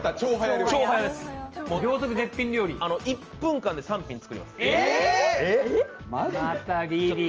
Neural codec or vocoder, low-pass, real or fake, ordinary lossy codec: none; 7.2 kHz; real; Opus, 24 kbps